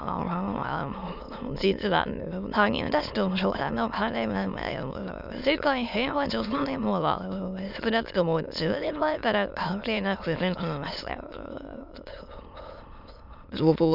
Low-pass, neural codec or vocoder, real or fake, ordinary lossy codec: 5.4 kHz; autoencoder, 22.05 kHz, a latent of 192 numbers a frame, VITS, trained on many speakers; fake; none